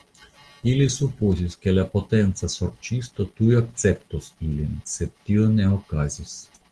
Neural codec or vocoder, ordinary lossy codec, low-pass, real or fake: none; Opus, 16 kbps; 10.8 kHz; real